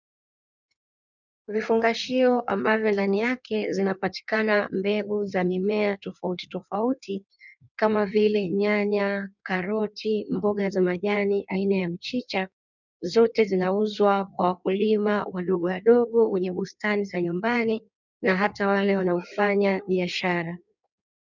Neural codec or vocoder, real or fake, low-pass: codec, 16 kHz in and 24 kHz out, 1.1 kbps, FireRedTTS-2 codec; fake; 7.2 kHz